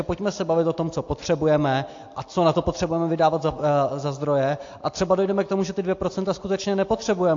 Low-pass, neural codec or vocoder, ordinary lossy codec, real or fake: 7.2 kHz; none; AAC, 48 kbps; real